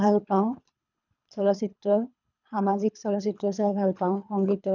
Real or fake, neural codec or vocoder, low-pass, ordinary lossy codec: fake; codec, 24 kHz, 3 kbps, HILCodec; 7.2 kHz; none